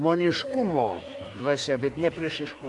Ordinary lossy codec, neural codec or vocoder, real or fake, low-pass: MP3, 64 kbps; codec, 24 kHz, 1 kbps, SNAC; fake; 10.8 kHz